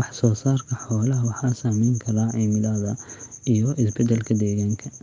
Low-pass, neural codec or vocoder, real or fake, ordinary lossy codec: 7.2 kHz; none; real; Opus, 32 kbps